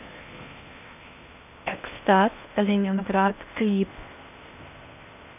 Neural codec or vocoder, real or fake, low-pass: codec, 16 kHz in and 24 kHz out, 0.6 kbps, FocalCodec, streaming, 4096 codes; fake; 3.6 kHz